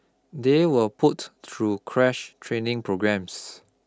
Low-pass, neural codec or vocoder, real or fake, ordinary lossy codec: none; none; real; none